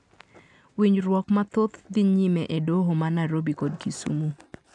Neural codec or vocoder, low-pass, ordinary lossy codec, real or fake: none; 10.8 kHz; none; real